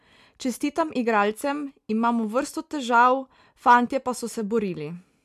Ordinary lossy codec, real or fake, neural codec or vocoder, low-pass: MP3, 96 kbps; real; none; 14.4 kHz